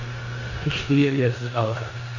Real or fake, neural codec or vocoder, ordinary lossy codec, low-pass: fake; codec, 16 kHz in and 24 kHz out, 0.9 kbps, LongCat-Audio-Codec, four codebook decoder; none; 7.2 kHz